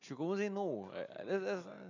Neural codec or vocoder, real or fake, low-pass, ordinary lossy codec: none; real; 7.2 kHz; none